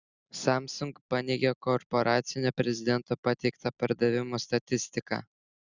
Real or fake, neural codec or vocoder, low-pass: real; none; 7.2 kHz